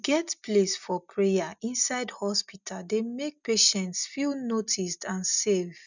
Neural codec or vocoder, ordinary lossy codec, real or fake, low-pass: none; none; real; 7.2 kHz